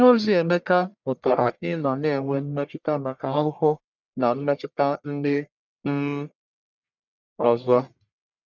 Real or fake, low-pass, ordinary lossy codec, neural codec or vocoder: fake; 7.2 kHz; none; codec, 44.1 kHz, 1.7 kbps, Pupu-Codec